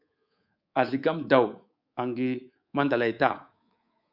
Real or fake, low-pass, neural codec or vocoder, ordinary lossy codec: fake; 5.4 kHz; codec, 24 kHz, 3.1 kbps, DualCodec; Opus, 64 kbps